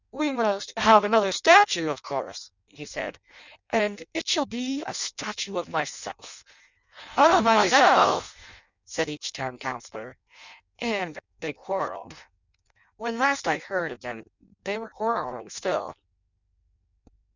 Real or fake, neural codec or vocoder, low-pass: fake; codec, 16 kHz in and 24 kHz out, 0.6 kbps, FireRedTTS-2 codec; 7.2 kHz